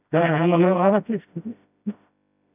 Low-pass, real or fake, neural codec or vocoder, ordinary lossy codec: 3.6 kHz; fake; codec, 16 kHz, 0.5 kbps, FreqCodec, smaller model; none